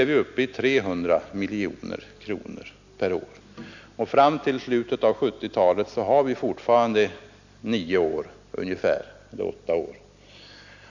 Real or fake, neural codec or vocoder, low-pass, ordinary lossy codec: real; none; 7.2 kHz; none